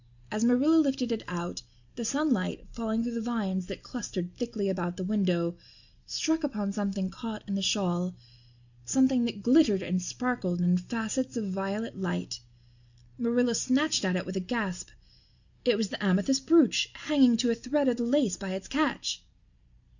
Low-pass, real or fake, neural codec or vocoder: 7.2 kHz; real; none